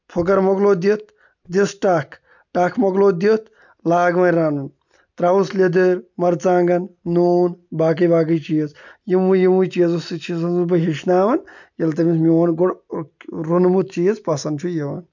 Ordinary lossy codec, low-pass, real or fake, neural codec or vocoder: none; 7.2 kHz; real; none